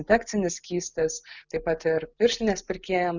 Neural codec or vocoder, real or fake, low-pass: none; real; 7.2 kHz